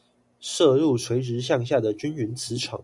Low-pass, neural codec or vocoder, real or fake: 10.8 kHz; none; real